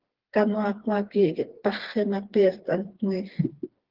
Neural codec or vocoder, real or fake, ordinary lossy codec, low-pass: codec, 16 kHz, 4 kbps, FreqCodec, smaller model; fake; Opus, 16 kbps; 5.4 kHz